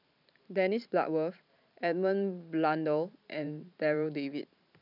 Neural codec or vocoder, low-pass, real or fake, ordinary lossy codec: vocoder, 44.1 kHz, 128 mel bands every 512 samples, BigVGAN v2; 5.4 kHz; fake; none